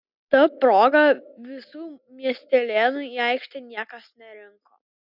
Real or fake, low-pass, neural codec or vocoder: real; 5.4 kHz; none